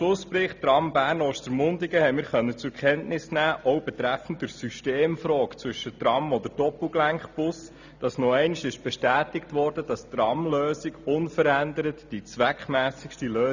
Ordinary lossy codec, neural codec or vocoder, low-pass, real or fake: none; none; 7.2 kHz; real